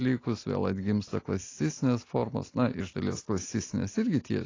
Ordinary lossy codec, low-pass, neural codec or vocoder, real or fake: AAC, 32 kbps; 7.2 kHz; none; real